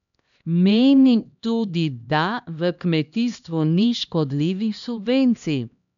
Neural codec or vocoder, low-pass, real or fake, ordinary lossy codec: codec, 16 kHz, 1 kbps, X-Codec, HuBERT features, trained on LibriSpeech; 7.2 kHz; fake; none